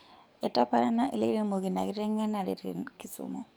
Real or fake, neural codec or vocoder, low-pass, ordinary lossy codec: fake; codec, 44.1 kHz, 7.8 kbps, DAC; none; none